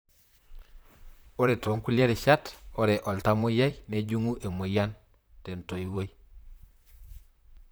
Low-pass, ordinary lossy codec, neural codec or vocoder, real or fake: none; none; vocoder, 44.1 kHz, 128 mel bands, Pupu-Vocoder; fake